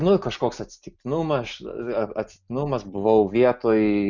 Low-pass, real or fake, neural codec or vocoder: 7.2 kHz; real; none